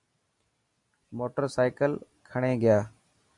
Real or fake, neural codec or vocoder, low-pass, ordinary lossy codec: real; none; 10.8 kHz; MP3, 96 kbps